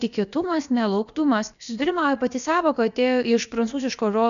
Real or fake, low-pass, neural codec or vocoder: fake; 7.2 kHz; codec, 16 kHz, about 1 kbps, DyCAST, with the encoder's durations